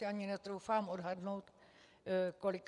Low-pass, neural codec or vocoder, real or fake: 10.8 kHz; vocoder, 24 kHz, 100 mel bands, Vocos; fake